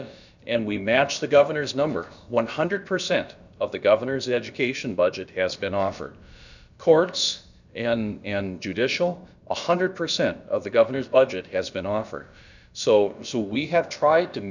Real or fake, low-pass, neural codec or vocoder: fake; 7.2 kHz; codec, 16 kHz, about 1 kbps, DyCAST, with the encoder's durations